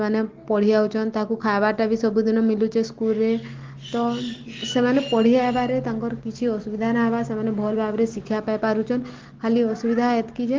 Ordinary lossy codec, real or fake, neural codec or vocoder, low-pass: Opus, 16 kbps; real; none; 7.2 kHz